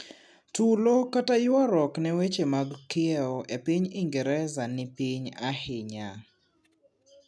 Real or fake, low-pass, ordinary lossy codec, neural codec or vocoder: real; none; none; none